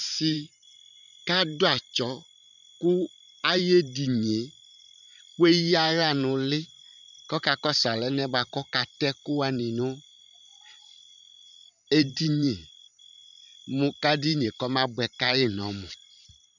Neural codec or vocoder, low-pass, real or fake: vocoder, 44.1 kHz, 128 mel bands every 512 samples, BigVGAN v2; 7.2 kHz; fake